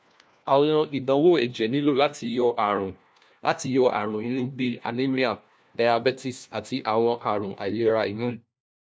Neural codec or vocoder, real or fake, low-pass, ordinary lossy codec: codec, 16 kHz, 1 kbps, FunCodec, trained on LibriTTS, 50 frames a second; fake; none; none